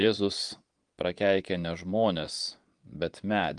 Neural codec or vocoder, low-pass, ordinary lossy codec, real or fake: vocoder, 22.05 kHz, 80 mel bands, Vocos; 9.9 kHz; Opus, 24 kbps; fake